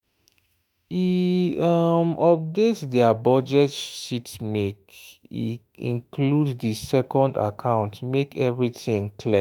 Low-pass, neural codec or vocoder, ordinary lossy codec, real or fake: none; autoencoder, 48 kHz, 32 numbers a frame, DAC-VAE, trained on Japanese speech; none; fake